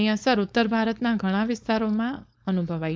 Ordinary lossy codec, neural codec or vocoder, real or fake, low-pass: none; codec, 16 kHz, 4.8 kbps, FACodec; fake; none